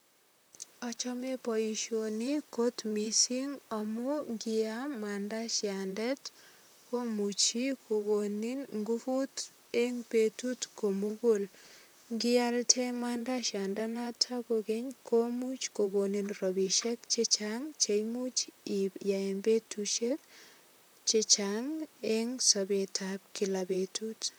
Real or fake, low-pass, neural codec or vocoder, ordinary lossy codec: fake; none; vocoder, 44.1 kHz, 128 mel bands, Pupu-Vocoder; none